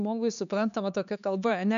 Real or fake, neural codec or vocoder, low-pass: fake; codec, 16 kHz, 2 kbps, X-Codec, HuBERT features, trained on LibriSpeech; 7.2 kHz